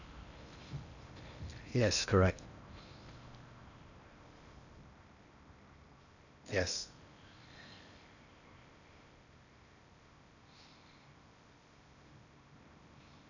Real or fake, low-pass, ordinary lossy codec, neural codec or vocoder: fake; 7.2 kHz; none; codec, 16 kHz in and 24 kHz out, 0.8 kbps, FocalCodec, streaming, 65536 codes